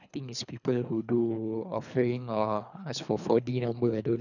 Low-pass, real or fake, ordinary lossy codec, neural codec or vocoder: 7.2 kHz; fake; none; codec, 24 kHz, 3 kbps, HILCodec